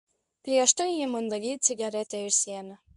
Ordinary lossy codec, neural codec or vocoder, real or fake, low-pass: MP3, 96 kbps; codec, 24 kHz, 0.9 kbps, WavTokenizer, medium speech release version 2; fake; 10.8 kHz